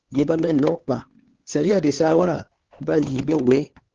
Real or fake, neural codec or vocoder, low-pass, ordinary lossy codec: fake; codec, 16 kHz, 2 kbps, X-Codec, HuBERT features, trained on LibriSpeech; 7.2 kHz; Opus, 16 kbps